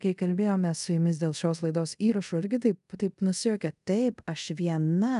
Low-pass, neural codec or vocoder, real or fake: 10.8 kHz; codec, 24 kHz, 0.5 kbps, DualCodec; fake